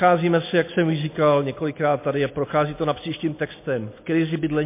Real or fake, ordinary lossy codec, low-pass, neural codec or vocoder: real; MP3, 24 kbps; 3.6 kHz; none